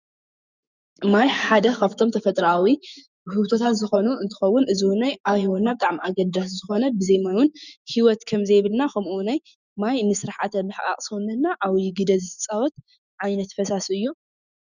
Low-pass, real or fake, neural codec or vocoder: 7.2 kHz; fake; autoencoder, 48 kHz, 128 numbers a frame, DAC-VAE, trained on Japanese speech